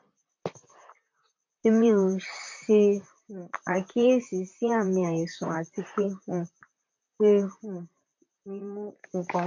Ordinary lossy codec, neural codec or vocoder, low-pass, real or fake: MP3, 48 kbps; vocoder, 44.1 kHz, 128 mel bands, Pupu-Vocoder; 7.2 kHz; fake